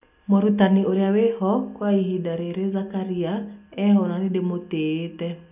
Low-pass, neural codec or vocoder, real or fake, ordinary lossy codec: 3.6 kHz; none; real; none